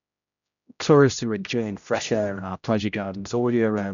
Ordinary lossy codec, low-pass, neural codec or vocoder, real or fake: none; 7.2 kHz; codec, 16 kHz, 0.5 kbps, X-Codec, HuBERT features, trained on general audio; fake